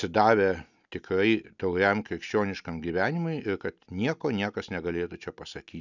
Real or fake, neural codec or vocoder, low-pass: real; none; 7.2 kHz